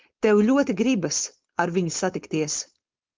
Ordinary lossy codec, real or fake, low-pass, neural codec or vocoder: Opus, 24 kbps; fake; 7.2 kHz; codec, 16 kHz, 4.8 kbps, FACodec